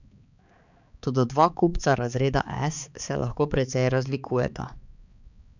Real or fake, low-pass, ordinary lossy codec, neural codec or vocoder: fake; 7.2 kHz; none; codec, 16 kHz, 4 kbps, X-Codec, HuBERT features, trained on balanced general audio